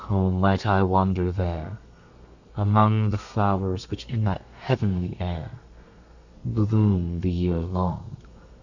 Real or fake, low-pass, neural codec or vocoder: fake; 7.2 kHz; codec, 32 kHz, 1.9 kbps, SNAC